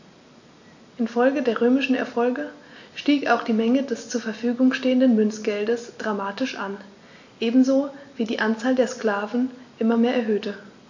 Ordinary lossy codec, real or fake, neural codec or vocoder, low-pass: AAC, 48 kbps; real; none; 7.2 kHz